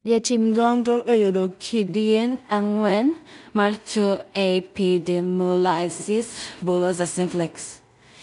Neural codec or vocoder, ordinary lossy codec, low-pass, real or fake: codec, 16 kHz in and 24 kHz out, 0.4 kbps, LongCat-Audio-Codec, two codebook decoder; none; 10.8 kHz; fake